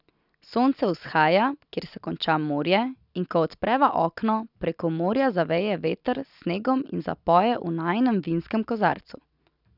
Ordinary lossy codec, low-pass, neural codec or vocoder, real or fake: none; 5.4 kHz; none; real